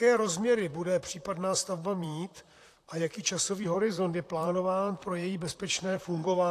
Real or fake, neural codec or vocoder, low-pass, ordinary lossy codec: fake; vocoder, 44.1 kHz, 128 mel bands, Pupu-Vocoder; 14.4 kHz; AAC, 64 kbps